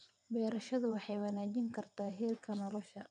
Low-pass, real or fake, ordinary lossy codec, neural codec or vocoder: 9.9 kHz; fake; none; vocoder, 22.05 kHz, 80 mel bands, WaveNeXt